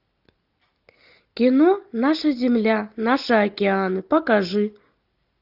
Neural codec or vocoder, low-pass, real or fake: none; 5.4 kHz; real